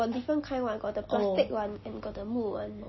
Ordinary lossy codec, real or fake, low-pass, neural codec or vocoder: MP3, 24 kbps; real; 7.2 kHz; none